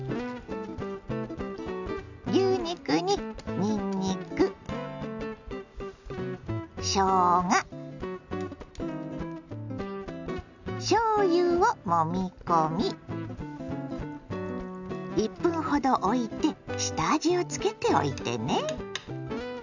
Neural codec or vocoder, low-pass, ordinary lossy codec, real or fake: none; 7.2 kHz; none; real